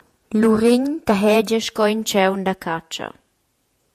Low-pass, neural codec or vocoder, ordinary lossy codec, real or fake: 14.4 kHz; vocoder, 48 kHz, 128 mel bands, Vocos; MP3, 96 kbps; fake